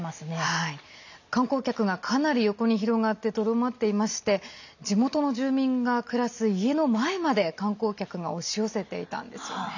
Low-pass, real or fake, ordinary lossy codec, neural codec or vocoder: 7.2 kHz; real; none; none